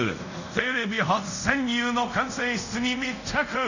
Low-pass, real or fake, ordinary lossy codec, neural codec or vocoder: 7.2 kHz; fake; none; codec, 24 kHz, 0.5 kbps, DualCodec